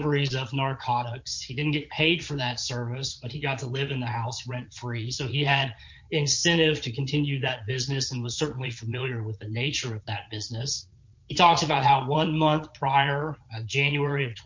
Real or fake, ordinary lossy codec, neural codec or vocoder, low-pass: fake; MP3, 48 kbps; vocoder, 22.05 kHz, 80 mel bands, Vocos; 7.2 kHz